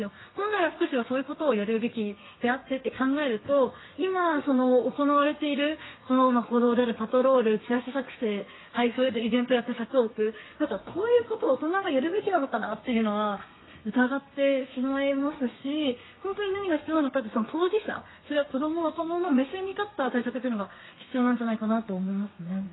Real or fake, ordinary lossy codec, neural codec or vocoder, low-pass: fake; AAC, 16 kbps; codec, 32 kHz, 1.9 kbps, SNAC; 7.2 kHz